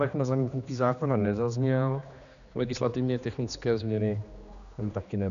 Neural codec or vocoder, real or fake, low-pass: codec, 16 kHz, 2 kbps, X-Codec, HuBERT features, trained on general audio; fake; 7.2 kHz